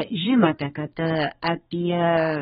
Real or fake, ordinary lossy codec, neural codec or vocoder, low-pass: fake; AAC, 16 kbps; codec, 32 kHz, 1.9 kbps, SNAC; 14.4 kHz